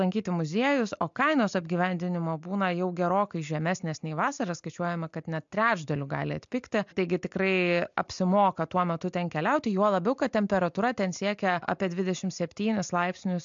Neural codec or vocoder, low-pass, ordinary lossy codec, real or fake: none; 7.2 kHz; MP3, 64 kbps; real